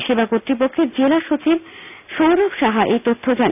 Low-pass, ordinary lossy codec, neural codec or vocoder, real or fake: 3.6 kHz; none; none; real